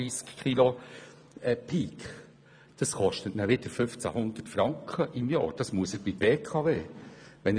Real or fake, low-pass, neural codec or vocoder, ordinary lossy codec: fake; 9.9 kHz; vocoder, 24 kHz, 100 mel bands, Vocos; none